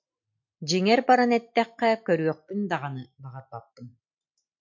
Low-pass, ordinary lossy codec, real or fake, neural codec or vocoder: 7.2 kHz; MP3, 48 kbps; real; none